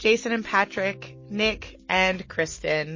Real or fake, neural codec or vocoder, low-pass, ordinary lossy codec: real; none; 7.2 kHz; MP3, 32 kbps